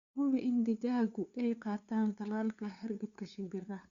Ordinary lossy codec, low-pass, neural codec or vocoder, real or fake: Opus, 64 kbps; 7.2 kHz; codec, 16 kHz, 2 kbps, FunCodec, trained on LibriTTS, 25 frames a second; fake